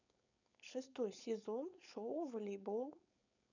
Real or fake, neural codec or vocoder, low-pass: fake; codec, 16 kHz, 4.8 kbps, FACodec; 7.2 kHz